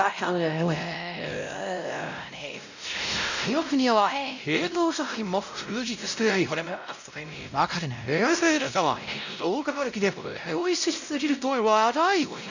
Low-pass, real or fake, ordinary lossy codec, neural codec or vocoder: 7.2 kHz; fake; none; codec, 16 kHz, 0.5 kbps, X-Codec, WavLM features, trained on Multilingual LibriSpeech